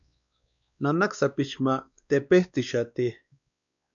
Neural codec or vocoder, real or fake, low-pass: codec, 16 kHz, 2 kbps, X-Codec, WavLM features, trained on Multilingual LibriSpeech; fake; 7.2 kHz